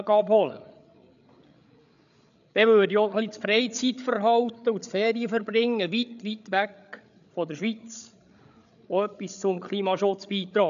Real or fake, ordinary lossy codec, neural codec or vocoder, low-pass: fake; none; codec, 16 kHz, 8 kbps, FreqCodec, larger model; 7.2 kHz